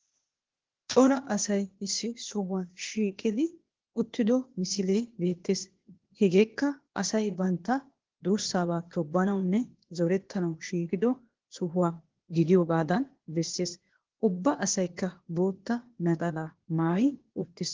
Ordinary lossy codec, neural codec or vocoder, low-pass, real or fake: Opus, 16 kbps; codec, 16 kHz, 0.8 kbps, ZipCodec; 7.2 kHz; fake